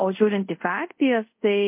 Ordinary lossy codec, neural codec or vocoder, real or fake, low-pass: MP3, 32 kbps; codec, 24 kHz, 0.5 kbps, DualCodec; fake; 3.6 kHz